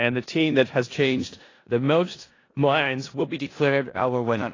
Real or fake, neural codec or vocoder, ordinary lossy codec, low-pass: fake; codec, 16 kHz in and 24 kHz out, 0.4 kbps, LongCat-Audio-Codec, four codebook decoder; AAC, 32 kbps; 7.2 kHz